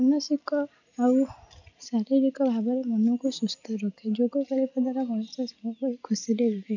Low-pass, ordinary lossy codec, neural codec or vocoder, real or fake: 7.2 kHz; none; none; real